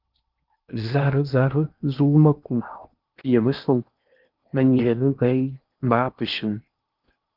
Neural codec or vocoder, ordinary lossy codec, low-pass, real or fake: codec, 16 kHz in and 24 kHz out, 0.8 kbps, FocalCodec, streaming, 65536 codes; Opus, 24 kbps; 5.4 kHz; fake